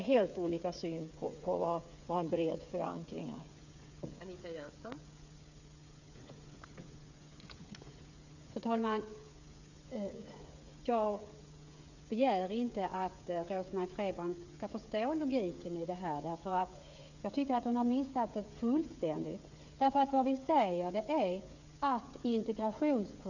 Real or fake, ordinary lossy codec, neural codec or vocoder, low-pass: fake; none; codec, 16 kHz, 8 kbps, FreqCodec, smaller model; 7.2 kHz